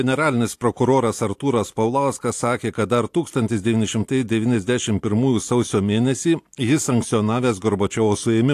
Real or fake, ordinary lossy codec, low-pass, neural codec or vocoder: real; AAC, 64 kbps; 14.4 kHz; none